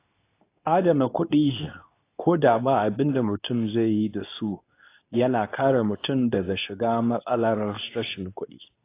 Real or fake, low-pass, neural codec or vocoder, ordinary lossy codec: fake; 3.6 kHz; codec, 24 kHz, 0.9 kbps, WavTokenizer, medium speech release version 2; AAC, 24 kbps